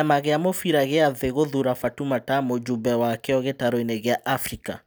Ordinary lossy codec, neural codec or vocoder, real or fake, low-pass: none; none; real; none